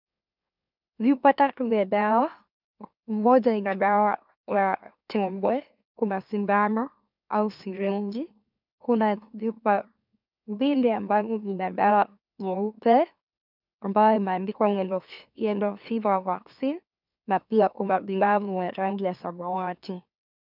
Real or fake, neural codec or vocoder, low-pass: fake; autoencoder, 44.1 kHz, a latent of 192 numbers a frame, MeloTTS; 5.4 kHz